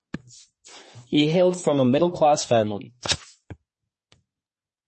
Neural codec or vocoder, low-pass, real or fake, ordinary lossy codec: codec, 24 kHz, 1 kbps, SNAC; 10.8 kHz; fake; MP3, 32 kbps